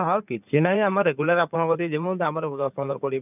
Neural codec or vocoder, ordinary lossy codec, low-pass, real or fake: codec, 16 kHz in and 24 kHz out, 2.2 kbps, FireRedTTS-2 codec; none; 3.6 kHz; fake